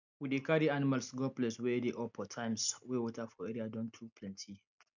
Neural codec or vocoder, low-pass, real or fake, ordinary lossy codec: none; 7.2 kHz; real; none